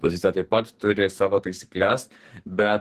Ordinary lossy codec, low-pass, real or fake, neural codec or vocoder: Opus, 16 kbps; 14.4 kHz; fake; codec, 44.1 kHz, 2.6 kbps, SNAC